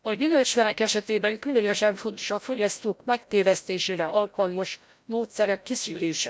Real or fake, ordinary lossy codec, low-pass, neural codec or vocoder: fake; none; none; codec, 16 kHz, 0.5 kbps, FreqCodec, larger model